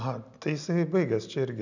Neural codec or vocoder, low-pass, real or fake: none; 7.2 kHz; real